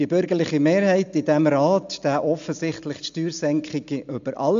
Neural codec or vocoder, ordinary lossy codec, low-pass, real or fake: none; MP3, 64 kbps; 7.2 kHz; real